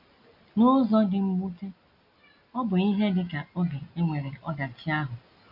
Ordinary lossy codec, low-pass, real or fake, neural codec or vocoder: none; 5.4 kHz; real; none